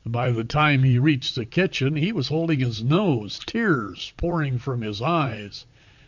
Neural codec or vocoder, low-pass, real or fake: vocoder, 44.1 kHz, 128 mel bands, Pupu-Vocoder; 7.2 kHz; fake